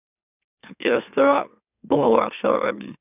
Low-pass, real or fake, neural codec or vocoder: 3.6 kHz; fake; autoencoder, 44.1 kHz, a latent of 192 numbers a frame, MeloTTS